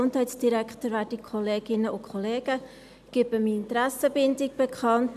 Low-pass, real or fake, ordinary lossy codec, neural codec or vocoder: 14.4 kHz; real; none; none